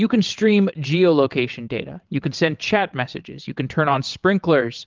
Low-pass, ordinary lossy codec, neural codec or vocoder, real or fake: 7.2 kHz; Opus, 32 kbps; vocoder, 22.05 kHz, 80 mel bands, WaveNeXt; fake